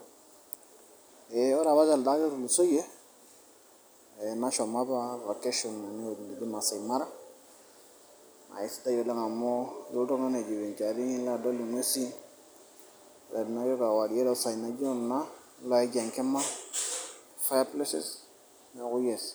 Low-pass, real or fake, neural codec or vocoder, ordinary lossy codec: none; real; none; none